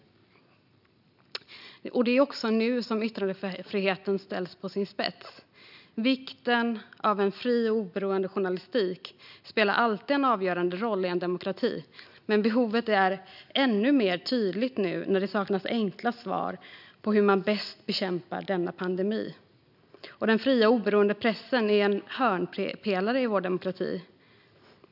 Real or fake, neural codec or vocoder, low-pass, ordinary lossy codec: real; none; 5.4 kHz; none